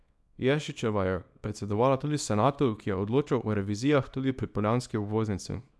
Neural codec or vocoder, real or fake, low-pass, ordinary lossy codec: codec, 24 kHz, 0.9 kbps, WavTokenizer, small release; fake; none; none